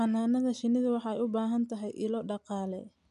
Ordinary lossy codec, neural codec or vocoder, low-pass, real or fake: none; none; 10.8 kHz; real